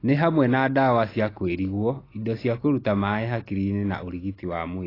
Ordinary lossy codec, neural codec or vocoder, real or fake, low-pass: AAC, 24 kbps; none; real; 5.4 kHz